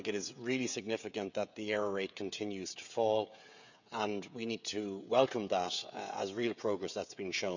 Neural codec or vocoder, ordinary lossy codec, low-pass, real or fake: codec, 16 kHz, 16 kbps, FreqCodec, smaller model; none; 7.2 kHz; fake